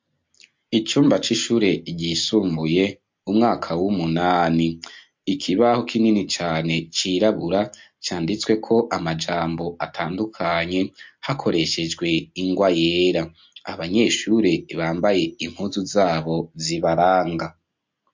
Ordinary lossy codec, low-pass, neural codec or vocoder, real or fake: MP3, 48 kbps; 7.2 kHz; none; real